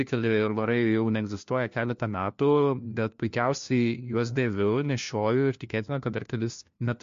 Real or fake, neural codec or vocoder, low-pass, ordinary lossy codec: fake; codec, 16 kHz, 1 kbps, FunCodec, trained on LibriTTS, 50 frames a second; 7.2 kHz; MP3, 48 kbps